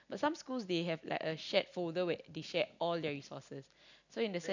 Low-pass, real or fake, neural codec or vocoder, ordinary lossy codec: 7.2 kHz; real; none; none